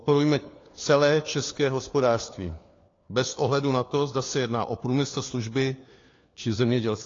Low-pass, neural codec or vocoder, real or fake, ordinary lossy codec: 7.2 kHz; codec, 16 kHz, 4 kbps, FunCodec, trained on LibriTTS, 50 frames a second; fake; AAC, 32 kbps